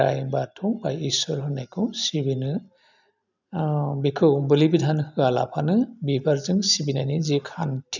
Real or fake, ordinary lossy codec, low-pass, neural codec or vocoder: real; none; 7.2 kHz; none